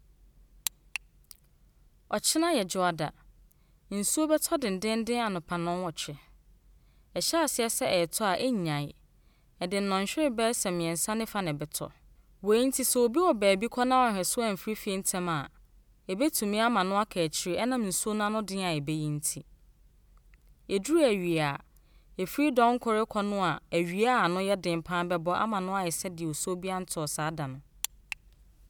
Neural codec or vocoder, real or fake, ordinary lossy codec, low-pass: none; real; none; none